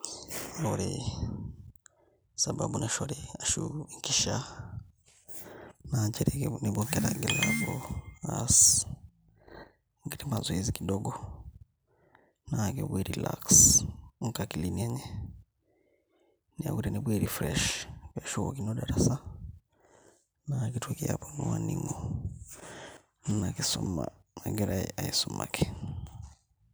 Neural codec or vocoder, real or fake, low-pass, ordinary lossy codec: none; real; none; none